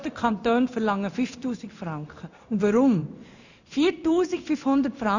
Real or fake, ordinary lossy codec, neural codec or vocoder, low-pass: fake; none; codec, 16 kHz in and 24 kHz out, 1 kbps, XY-Tokenizer; 7.2 kHz